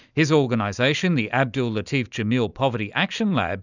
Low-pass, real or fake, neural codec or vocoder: 7.2 kHz; real; none